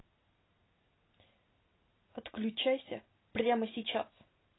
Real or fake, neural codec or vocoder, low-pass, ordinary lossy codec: real; none; 7.2 kHz; AAC, 16 kbps